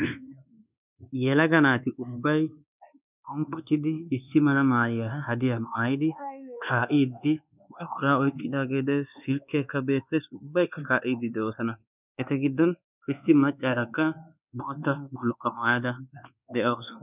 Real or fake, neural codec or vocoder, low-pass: fake; codec, 24 kHz, 1.2 kbps, DualCodec; 3.6 kHz